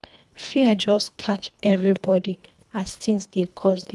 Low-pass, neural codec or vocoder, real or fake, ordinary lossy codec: none; codec, 24 kHz, 1.5 kbps, HILCodec; fake; none